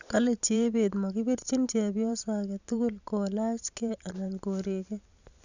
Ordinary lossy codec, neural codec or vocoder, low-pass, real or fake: none; vocoder, 44.1 kHz, 128 mel bands every 512 samples, BigVGAN v2; 7.2 kHz; fake